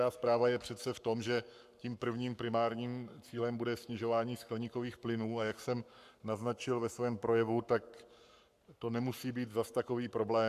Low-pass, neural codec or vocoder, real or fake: 14.4 kHz; codec, 44.1 kHz, 7.8 kbps, Pupu-Codec; fake